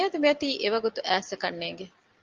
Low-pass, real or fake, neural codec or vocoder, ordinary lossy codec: 7.2 kHz; real; none; Opus, 32 kbps